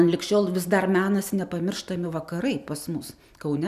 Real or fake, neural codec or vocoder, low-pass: real; none; 14.4 kHz